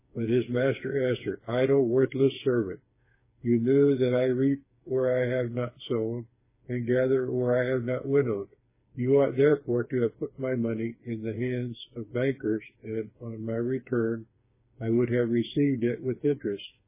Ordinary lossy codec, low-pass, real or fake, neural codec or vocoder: MP3, 16 kbps; 3.6 kHz; fake; codec, 16 kHz, 4 kbps, FreqCodec, smaller model